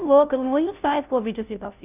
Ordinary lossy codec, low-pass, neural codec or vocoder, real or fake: none; 3.6 kHz; codec, 16 kHz, 0.5 kbps, FunCodec, trained on Chinese and English, 25 frames a second; fake